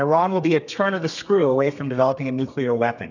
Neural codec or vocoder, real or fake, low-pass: codec, 32 kHz, 1.9 kbps, SNAC; fake; 7.2 kHz